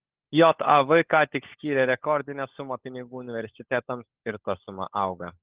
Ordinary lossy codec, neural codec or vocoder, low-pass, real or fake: Opus, 16 kbps; codec, 16 kHz, 16 kbps, FunCodec, trained on LibriTTS, 50 frames a second; 3.6 kHz; fake